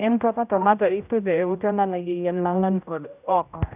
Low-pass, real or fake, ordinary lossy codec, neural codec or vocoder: 3.6 kHz; fake; none; codec, 16 kHz, 0.5 kbps, X-Codec, HuBERT features, trained on general audio